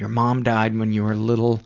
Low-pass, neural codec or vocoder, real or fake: 7.2 kHz; none; real